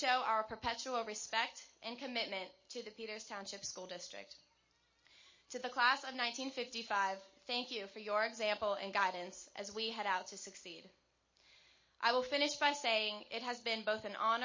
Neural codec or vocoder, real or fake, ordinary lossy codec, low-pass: none; real; MP3, 32 kbps; 7.2 kHz